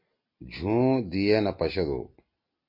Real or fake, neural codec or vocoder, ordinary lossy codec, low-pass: real; none; MP3, 32 kbps; 5.4 kHz